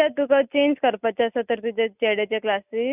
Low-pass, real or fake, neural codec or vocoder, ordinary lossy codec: 3.6 kHz; real; none; none